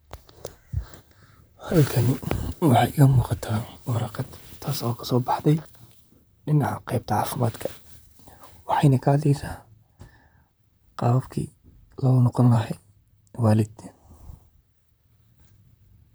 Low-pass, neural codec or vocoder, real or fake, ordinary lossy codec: none; vocoder, 44.1 kHz, 128 mel bands, Pupu-Vocoder; fake; none